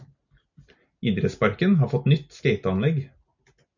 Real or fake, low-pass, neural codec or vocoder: real; 7.2 kHz; none